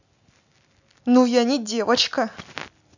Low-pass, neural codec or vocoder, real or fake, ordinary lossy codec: 7.2 kHz; none; real; none